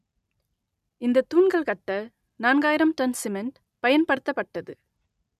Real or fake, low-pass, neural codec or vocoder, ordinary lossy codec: real; 14.4 kHz; none; none